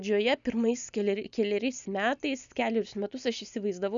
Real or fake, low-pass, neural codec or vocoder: real; 7.2 kHz; none